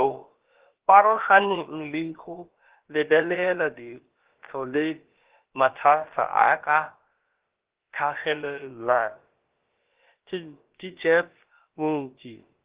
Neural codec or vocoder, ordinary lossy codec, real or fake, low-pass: codec, 16 kHz, about 1 kbps, DyCAST, with the encoder's durations; Opus, 16 kbps; fake; 3.6 kHz